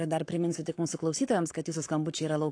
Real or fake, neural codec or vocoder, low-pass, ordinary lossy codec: fake; codec, 44.1 kHz, 7.8 kbps, Pupu-Codec; 9.9 kHz; AAC, 48 kbps